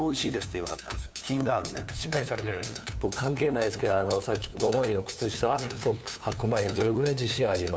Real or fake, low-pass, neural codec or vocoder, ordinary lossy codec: fake; none; codec, 16 kHz, 2 kbps, FunCodec, trained on LibriTTS, 25 frames a second; none